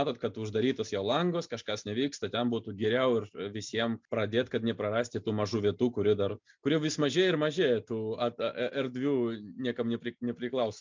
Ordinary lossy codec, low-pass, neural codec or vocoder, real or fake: MP3, 64 kbps; 7.2 kHz; none; real